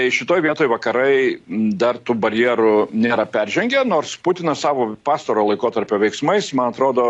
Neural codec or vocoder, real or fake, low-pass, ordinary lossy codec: none; real; 10.8 kHz; AAC, 48 kbps